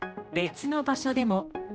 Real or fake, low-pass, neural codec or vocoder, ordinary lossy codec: fake; none; codec, 16 kHz, 1 kbps, X-Codec, HuBERT features, trained on general audio; none